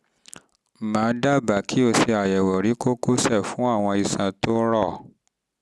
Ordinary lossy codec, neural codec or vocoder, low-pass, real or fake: none; none; none; real